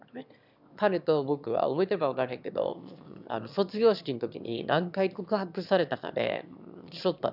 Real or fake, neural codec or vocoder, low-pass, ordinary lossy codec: fake; autoencoder, 22.05 kHz, a latent of 192 numbers a frame, VITS, trained on one speaker; 5.4 kHz; none